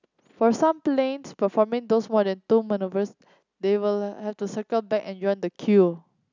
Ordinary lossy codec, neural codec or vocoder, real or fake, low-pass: none; none; real; 7.2 kHz